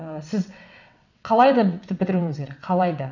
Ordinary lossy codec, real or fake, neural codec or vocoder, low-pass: none; fake; vocoder, 22.05 kHz, 80 mel bands, WaveNeXt; 7.2 kHz